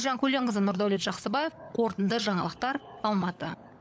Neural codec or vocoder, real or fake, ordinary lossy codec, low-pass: codec, 16 kHz, 16 kbps, FunCodec, trained on LibriTTS, 50 frames a second; fake; none; none